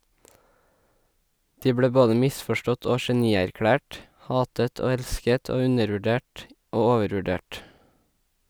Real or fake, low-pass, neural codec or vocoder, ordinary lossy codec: real; none; none; none